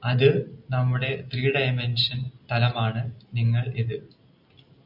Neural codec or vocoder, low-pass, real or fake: none; 5.4 kHz; real